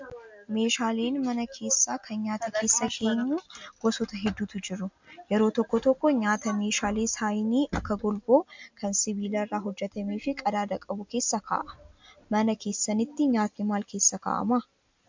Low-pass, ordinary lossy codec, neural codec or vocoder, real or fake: 7.2 kHz; MP3, 64 kbps; none; real